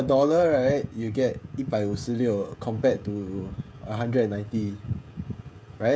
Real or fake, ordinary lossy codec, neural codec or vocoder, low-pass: fake; none; codec, 16 kHz, 16 kbps, FreqCodec, smaller model; none